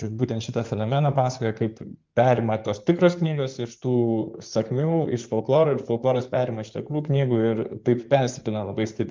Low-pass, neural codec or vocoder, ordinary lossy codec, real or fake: 7.2 kHz; codec, 16 kHz in and 24 kHz out, 2.2 kbps, FireRedTTS-2 codec; Opus, 24 kbps; fake